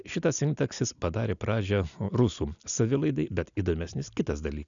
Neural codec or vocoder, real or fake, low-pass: none; real; 7.2 kHz